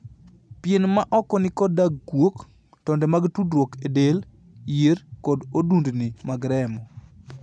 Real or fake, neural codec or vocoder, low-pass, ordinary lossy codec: real; none; none; none